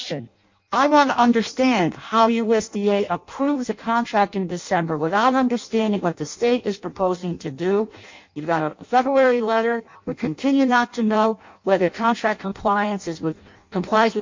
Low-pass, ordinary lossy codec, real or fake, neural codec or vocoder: 7.2 kHz; MP3, 48 kbps; fake; codec, 16 kHz in and 24 kHz out, 0.6 kbps, FireRedTTS-2 codec